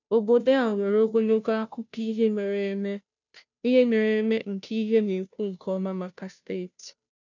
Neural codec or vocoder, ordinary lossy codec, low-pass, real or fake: codec, 16 kHz, 0.5 kbps, FunCodec, trained on Chinese and English, 25 frames a second; none; 7.2 kHz; fake